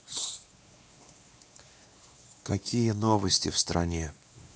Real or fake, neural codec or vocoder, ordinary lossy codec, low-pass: fake; codec, 16 kHz, 2 kbps, X-Codec, WavLM features, trained on Multilingual LibriSpeech; none; none